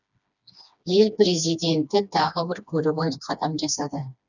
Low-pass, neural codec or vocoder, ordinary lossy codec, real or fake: 7.2 kHz; codec, 16 kHz, 2 kbps, FreqCodec, smaller model; none; fake